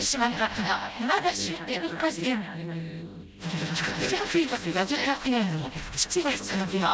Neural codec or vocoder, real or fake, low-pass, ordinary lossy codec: codec, 16 kHz, 0.5 kbps, FreqCodec, smaller model; fake; none; none